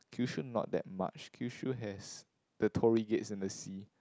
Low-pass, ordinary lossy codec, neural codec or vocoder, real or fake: none; none; none; real